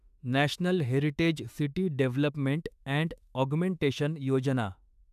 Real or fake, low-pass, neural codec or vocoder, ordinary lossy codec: fake; 14.4 kHz; autoencoder, 48 kHz, 32 numbers a frame, DAC-VAE, trained on Japanese speech; none